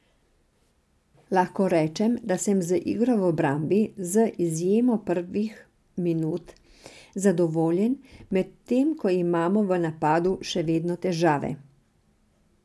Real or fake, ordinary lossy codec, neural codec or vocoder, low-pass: real; none; none; none